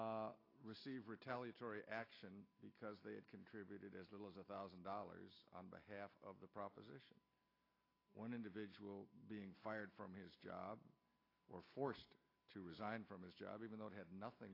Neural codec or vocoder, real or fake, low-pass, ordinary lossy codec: none; real; 5.4 kHz; AAC, 24 kbps